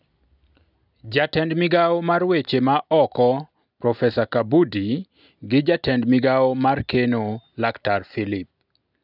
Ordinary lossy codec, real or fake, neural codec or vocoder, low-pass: none; real; none; 5.4 kHz